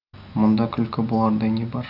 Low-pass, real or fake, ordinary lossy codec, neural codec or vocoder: 5.4 kHz; real; none; none